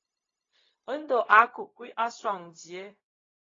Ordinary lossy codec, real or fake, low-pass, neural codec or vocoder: AAC, 32 kbps; fake; 7.2 kHz; codec, 16 kHz, 0.4 kbps, LongCat-Audio-Codec